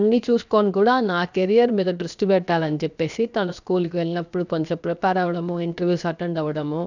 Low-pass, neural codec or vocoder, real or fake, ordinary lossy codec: 7.2 kHz; codec, 16 kHz, 0.7 kbps, FocalCodec; fake; none